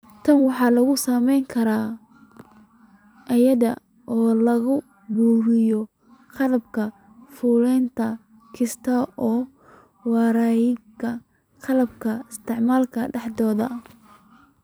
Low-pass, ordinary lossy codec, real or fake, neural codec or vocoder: none; none; fake; vocoder, 44.1 kHz, 128 mel bands every 256 samples, BigVGAN v2